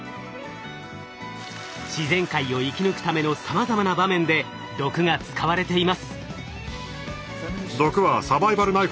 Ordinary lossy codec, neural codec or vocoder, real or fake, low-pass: none; none; real; none